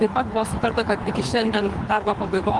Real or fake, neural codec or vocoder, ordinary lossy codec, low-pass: fake; codec, 24 kHz, 3 kbps, HILCodec; Opus, 24 kbps; 10.8 kHz